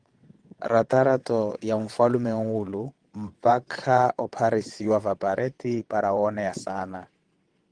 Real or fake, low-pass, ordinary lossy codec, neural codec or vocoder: fake; 9.9 kHz; Opus, 24 kbps; vocoder, 22.05 kHz, 80 mel bands, WaveNeXt